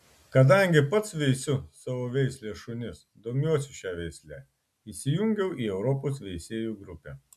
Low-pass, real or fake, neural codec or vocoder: 14.4 kHz; real; none